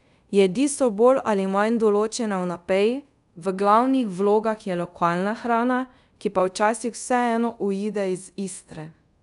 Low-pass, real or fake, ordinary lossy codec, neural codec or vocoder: 10.8 kHz; fake; none; codec, 24 kHz, 0.5 kbps, DualCodec